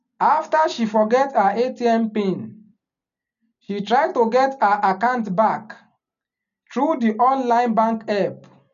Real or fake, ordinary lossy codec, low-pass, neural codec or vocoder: real; none; 7.2 kHz; none